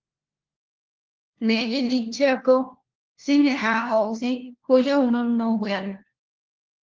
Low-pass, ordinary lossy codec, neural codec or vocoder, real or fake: 7.2 kHz; Opus, 16 kbps; codec, 16 kHz, 1 kbps, FunCodec, trained on LibriTTS, 50 frames a second; fake